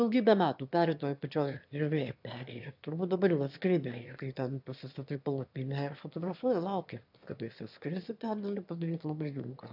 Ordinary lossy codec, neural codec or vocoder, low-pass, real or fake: AAC, 48 kbps; autoencoder, 22.05 kHz, a latent of 192 numbers a frame, VITS, trained on one speaker; 5.4 kHz; fake